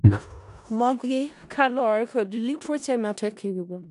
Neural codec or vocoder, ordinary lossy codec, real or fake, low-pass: codec, 16 kHz in and 24 kHz out, 0.4 kbps, LongCat-Audio-Codec, four codebook decoder; none; fake; 10.8 kHz